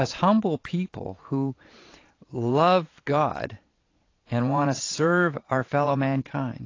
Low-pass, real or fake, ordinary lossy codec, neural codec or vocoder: 7.2 kHz; fake; AAC, 32 kbps; vocoder, 22.05 kHz, 80 mel bands, Vocos